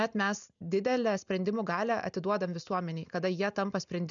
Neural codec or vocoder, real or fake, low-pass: none; real; 7.2 kHz